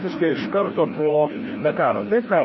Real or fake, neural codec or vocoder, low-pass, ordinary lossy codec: fake; codec, 16 kHz, 1 kbps, FreqCodec, larger model; 7.2 kHz; MP3, 24 kbps